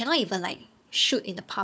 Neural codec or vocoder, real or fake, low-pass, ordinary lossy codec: codec, 16 kHz, 4 kbps, FunCodec, trained on Chinese and English, 50 frames a second; fake; none; none